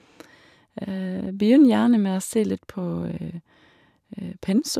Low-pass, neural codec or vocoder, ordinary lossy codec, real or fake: 14.4 kHz; vocoder, 44.1 kHz, 128 mel bands every 512 samples, BigVGAN v2; none; fake